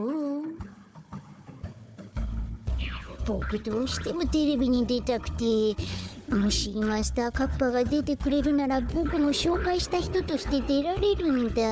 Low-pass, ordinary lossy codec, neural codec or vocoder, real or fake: none; none; codec, 16 kHz, 4 kbps, FunCodec, trained on Chinese and English, 50 frames a second; fake